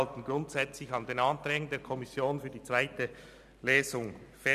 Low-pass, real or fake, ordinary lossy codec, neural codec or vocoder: 14.4 kHz; real; none; none